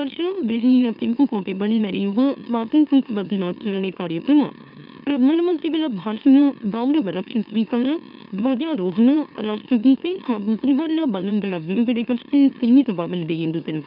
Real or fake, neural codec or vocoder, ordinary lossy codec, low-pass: fake; autoencoder, 44.1 kHz, a latent of 192 numbers a frame, MeloTTS; none; 5.4 kHz